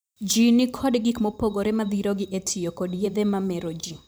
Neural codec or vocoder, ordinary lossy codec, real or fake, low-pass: vocoder, 44.1 kHz, 128 mel bands every 512 samples, BigVGAN v2; none; fake; none